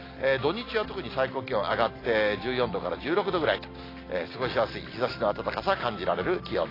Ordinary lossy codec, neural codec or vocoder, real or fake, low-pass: AAC, 24 kbps; none; real; 5.4 kHz